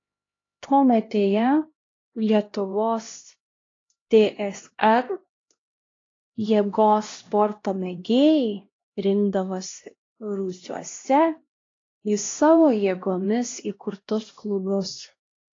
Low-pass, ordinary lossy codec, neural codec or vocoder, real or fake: 7.2 kHz; AAC, 32 kbps; codec, 16 kHz, 1 kbps, X-Codec, HuBERT features, trained on LibriSpeech; fake